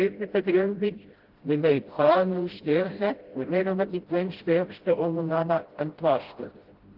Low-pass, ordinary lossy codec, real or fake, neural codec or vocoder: 5.4 kHz; Opus, 16 kbps; fake; codec, 16 kHz, 0.5 kbps, FreqCodec, smaller model